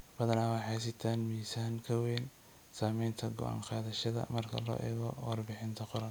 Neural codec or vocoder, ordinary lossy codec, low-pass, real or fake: none; none; none; real